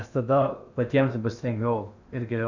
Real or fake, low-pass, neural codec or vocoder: fake; 7.2 kHz; codec, 16 kHz in and 24 kHz out, 0.8 kbps, FocalCodec, streaming, 65536 codes